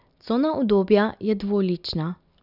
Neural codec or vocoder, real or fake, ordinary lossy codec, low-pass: none; real; none; 5.4 kHz